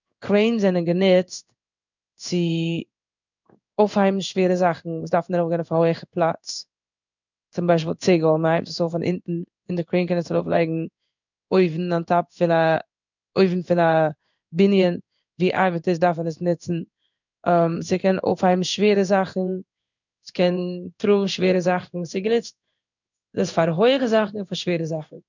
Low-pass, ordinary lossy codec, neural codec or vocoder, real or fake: 7.2 kHz; none; codec, 16 kHz in and 24 kHz out, 1 kbps, XY-Tokenizer; fake